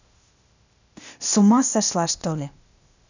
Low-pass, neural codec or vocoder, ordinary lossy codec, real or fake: 7.2 kHz; codec, 16 kHz, 0.8 kbps, ZipCodec; none; fake